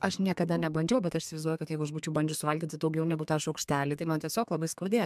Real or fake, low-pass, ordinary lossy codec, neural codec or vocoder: fake; 14.4 kHz; MP3, 96 kbps; codec, 32 kHz, 1.9 kbps, SNAC